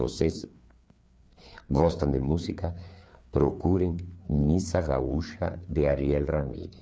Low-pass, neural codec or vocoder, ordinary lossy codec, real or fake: none; codec, 16 kHz, 4 kbps, FreqCodec, larger model; none; fake